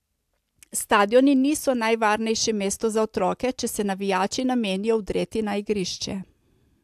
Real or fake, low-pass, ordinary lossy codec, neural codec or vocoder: real; 14.4 kHz; AAC, 96 kbps; none